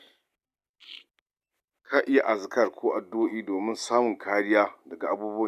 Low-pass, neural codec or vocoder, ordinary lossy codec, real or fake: 14.4 kHz; none; none; real